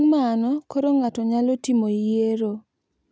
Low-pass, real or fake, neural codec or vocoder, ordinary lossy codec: none; real; none; none